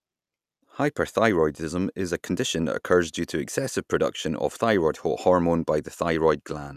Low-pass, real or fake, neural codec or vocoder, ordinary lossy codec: 14.4 kHz; real; none; none